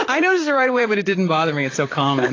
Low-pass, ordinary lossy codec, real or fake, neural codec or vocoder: 7.2 kHz; AAC, 32 kbps; fake; vocoder, 44.1 kHz, 128 mel bands, Pupu-Vocoder